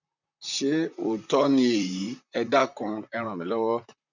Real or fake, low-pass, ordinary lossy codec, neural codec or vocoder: fake; 7.2 kHz; none; vocoder, 44.1 kHz, 128 mel bands, Pupu-Vocoder